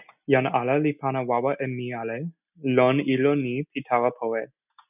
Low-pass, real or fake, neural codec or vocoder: 3.6 kHz; real; none